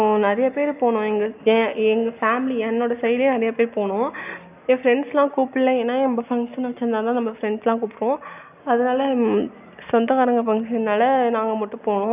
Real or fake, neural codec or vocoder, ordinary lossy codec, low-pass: real; none; none; 3.6 kHz